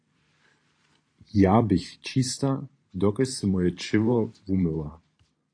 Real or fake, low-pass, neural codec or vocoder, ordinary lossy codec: fake; 9.9 kHz; vocoder, 44.1 kHz, 128 mel bands every 512 samples, BigVGAN v2; AAC, 48 kbps